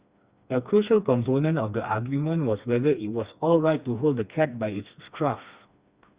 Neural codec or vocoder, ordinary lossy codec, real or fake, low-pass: codec, 16 kHz, 2 kbps, FreqCodec, smaller model; Opus, 64 kbps; fake; 3.6 kHz